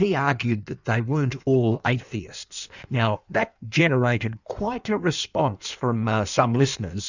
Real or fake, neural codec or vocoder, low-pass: fake; codec, 16 kHz in and 24 kHz out, 1.1 kbps, FireRedTTS-2 codec; 7.2 kHz